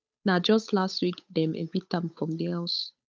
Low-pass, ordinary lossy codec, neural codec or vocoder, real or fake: none; none; codec, 16 kHz, 8 kbps, FunCodec, trained on Chinese and English, 25 frames a second; fake